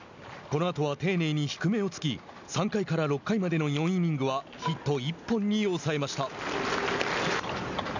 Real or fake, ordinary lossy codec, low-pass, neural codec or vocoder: real; none; 7.2 kHz; none